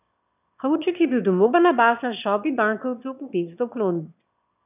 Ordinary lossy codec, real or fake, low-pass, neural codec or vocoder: none; fake; 3.6 kHz; autoencoder, 22.05 kHz, a latent of 192 numbers a frame, VITS, trained on one speaker